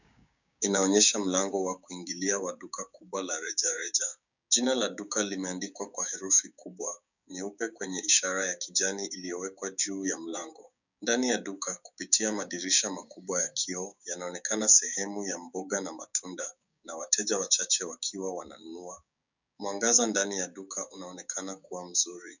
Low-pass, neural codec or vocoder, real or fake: 7.2 kHz; codec, 16 kHz, 6 kbps, DAC; fake